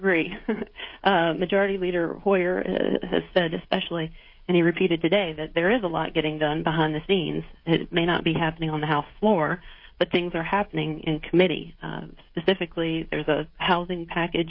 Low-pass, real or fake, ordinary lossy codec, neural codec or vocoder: 5.4 kHz; fake; MP3, 32 kbps; codec, 16 kHz, 16 kbps, FreqCodec, smaller model